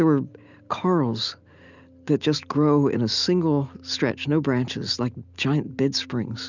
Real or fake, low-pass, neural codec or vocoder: real; 7.2 kHz; none